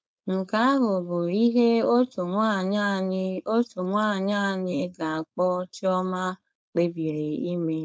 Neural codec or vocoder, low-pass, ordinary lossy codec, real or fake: codec, 16 kHz, 4.8 kbps, FACodec; none; none; fake